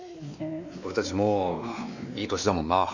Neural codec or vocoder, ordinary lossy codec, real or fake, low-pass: codec, 16 kHz, 2 kbps, X-Codec, WavLM features, trained on Multilingual LibriSpeech; none; fake; 7.2 kHz